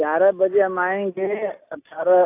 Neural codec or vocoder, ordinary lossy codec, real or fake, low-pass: none; AAC, 24 kbps; real; 3.6 kHz